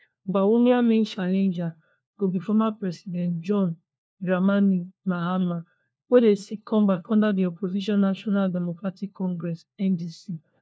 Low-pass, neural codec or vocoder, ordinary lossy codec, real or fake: none; codec, 16 kHz, 1 kbps, FunCodec, trained on LibriTTS, 50 frames a second; none; fake